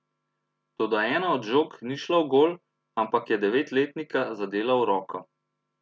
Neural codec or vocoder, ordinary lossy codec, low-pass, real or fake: none; none; none; real